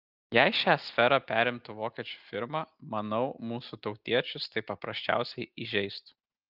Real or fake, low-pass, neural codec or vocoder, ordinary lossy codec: real; 5.4 kHz; none; Opus, 32 kbps